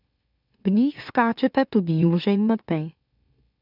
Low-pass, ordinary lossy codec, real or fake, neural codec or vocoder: 5.4 kHz; none; fake; autoencoder, 44.1 kHz, a latent of 192 numbers a frame, MeloTTS